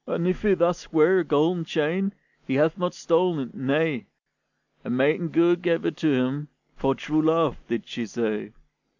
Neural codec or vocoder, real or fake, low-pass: none; real; 7.2 kHz